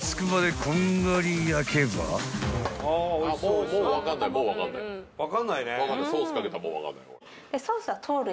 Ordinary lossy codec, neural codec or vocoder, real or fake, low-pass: none; none; real; none